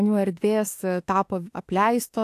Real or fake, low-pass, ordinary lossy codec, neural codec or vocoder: fake; 14.4 kHz; AAC, 64 kbps; autoencoder, 48 kHz, 32 numbers a frame, DAC-VAE, trained on Japanese speech